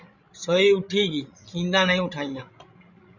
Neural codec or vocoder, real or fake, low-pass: codec, 16 kHz, 16 kbps, FreqCodec, larger model; fake; 7.2 kHz